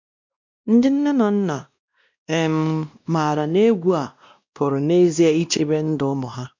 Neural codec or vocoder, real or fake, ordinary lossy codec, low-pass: codec, 16 kHz, 1 kbps, X-Codec, WavLM features, trained on Multilingual LibriSpeech; fake; MP3, 64 kbps; 7.2 kHz